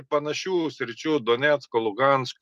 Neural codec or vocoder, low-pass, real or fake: none; 14.4 kHz; real